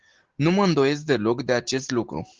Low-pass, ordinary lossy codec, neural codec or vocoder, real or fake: 7.2 kHz; Opus, 16 kbps; none; real